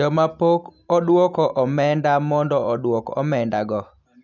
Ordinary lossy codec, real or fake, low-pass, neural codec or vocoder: none; real; 7.2 kHz; none